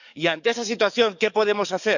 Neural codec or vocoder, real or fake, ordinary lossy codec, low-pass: codec, 44.1 kHz, 7.8 kbps, DAC; fake; none; 7.2 kHz